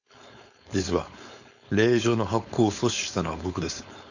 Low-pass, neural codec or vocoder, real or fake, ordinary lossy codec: 7.2 kHz; codec, 16 kHz, 4.8 kbps, FACodec; fake; none